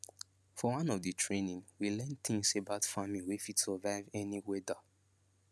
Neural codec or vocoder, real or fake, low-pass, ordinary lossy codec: none; real; none; none